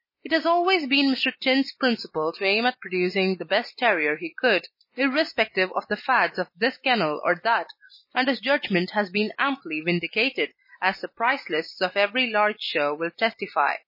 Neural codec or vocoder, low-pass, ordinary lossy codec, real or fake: none; 5.4 kHz; MP3, 24 kbps; real